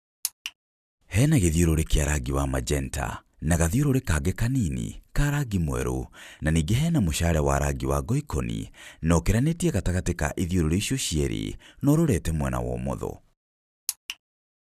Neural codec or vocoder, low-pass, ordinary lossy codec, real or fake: none; 14.4 kHz; none; real